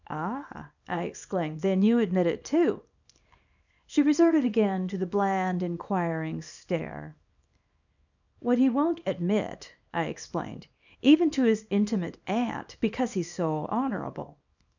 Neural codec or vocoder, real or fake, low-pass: codec, 24 kHz, 0.9 kbps, WavTokenizer, small release; fake; 7.2 kHz